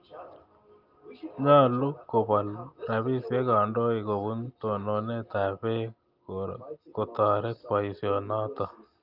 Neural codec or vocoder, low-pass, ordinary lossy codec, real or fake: none; 5.4 kHz; Opus, 24 kbps; real